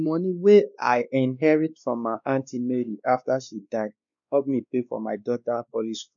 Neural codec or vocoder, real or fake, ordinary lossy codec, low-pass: codec, 16 kHz, 2 kbps, X-Codec, WavLM features, trained on Multilingual LibriSpeech; fake; none; 7.2 kHz